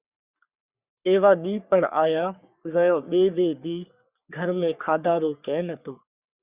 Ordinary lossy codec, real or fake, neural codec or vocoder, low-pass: Opus, 64 kbps; fake; codec, 44.1 kHz, 3.4 kbps, Pupu-Codec; 3.6 kHz